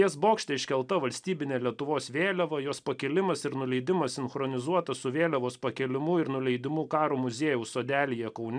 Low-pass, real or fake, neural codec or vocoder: 9.9 kHz; real; none